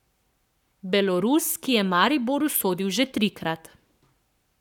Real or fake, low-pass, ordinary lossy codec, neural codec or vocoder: fake; 19.8 kHz; none; codec, 44.1 kHz, 7.8 kbps, Pupu-Codec